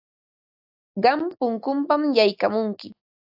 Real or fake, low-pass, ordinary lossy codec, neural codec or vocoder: real; 5.4 kHz; AAC, 48 kbps; none